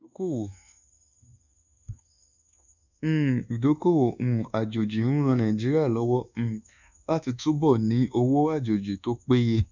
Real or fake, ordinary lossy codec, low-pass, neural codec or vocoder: fake; none; 7.2 kHz; codec, 24 kHz, 1.2 kbps, DualCodec